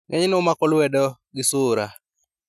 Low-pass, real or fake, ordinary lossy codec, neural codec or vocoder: 14.4 kHz; real; none; none